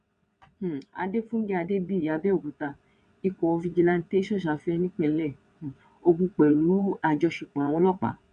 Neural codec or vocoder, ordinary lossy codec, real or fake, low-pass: vocoder, 22.05 kHz, 80 mel bands, WaveNeXt; MP3, 64 kbps; fake; 9.9 kHz